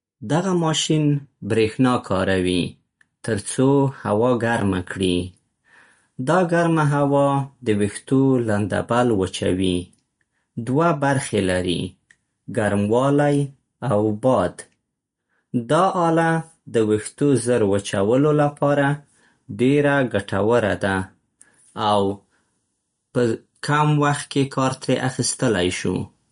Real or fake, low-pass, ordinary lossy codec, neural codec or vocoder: real; 10.8 kHz; MP3, 48 kbps; none